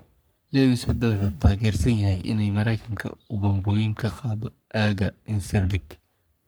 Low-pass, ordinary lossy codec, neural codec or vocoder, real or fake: none; none; codec, 44.1 kHz, 3.4 kbps, Pupu-Codec; fake